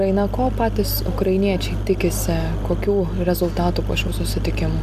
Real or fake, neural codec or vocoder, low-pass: real; none; 14.4 kHz